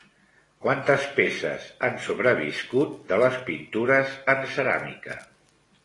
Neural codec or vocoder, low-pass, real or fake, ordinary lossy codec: none; 10.8 kHz; real; AAC, 32 kbps